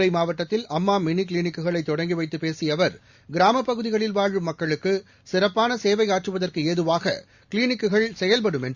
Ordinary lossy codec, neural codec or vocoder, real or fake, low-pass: Opus, 64 kbps; none; real; 7.2 kHz